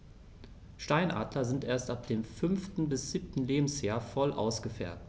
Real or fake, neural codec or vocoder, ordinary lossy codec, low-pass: real; none; none; none